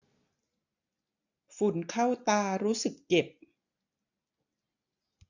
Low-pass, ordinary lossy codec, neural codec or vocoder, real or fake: 7.2 kHz; none; none; real